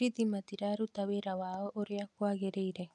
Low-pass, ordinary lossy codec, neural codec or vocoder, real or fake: 10.8 kHz; none; none; real